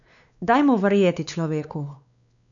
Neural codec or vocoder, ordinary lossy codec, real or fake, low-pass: codec, 16 kHz, 4 kbps, X-Codec, WavLM features, trained on Multilingual LibriSpeech; none; fake; 7.2 kHz